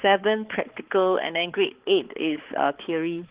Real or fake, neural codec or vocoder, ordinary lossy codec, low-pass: fake; codec, 16 kHz, 4 kbps, X-Codec, HuBERT features, trained on balanced general audio; Opus, 16 kbps; 3.6 kHz